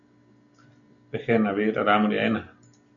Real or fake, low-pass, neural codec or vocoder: real; 7.2 kHz; none